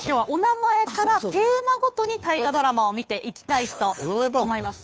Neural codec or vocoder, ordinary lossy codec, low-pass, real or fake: codec, 16 kHz, 2 kbps, FunCodec, trained on Chinese and English, 25 frames a second; none; none; fake